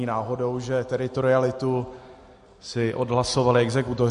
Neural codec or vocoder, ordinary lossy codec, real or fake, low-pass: none; MP3, 48 kbps; real; 10.8 kHz